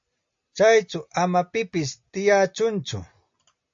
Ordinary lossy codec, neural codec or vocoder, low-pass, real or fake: AAC, 64 kbps; none; 7.2 kHz; real